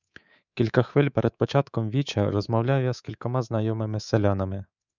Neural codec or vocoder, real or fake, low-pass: codec, 24 kHz, 3.1 kbps, DualCodec; fake; 7.2 kHz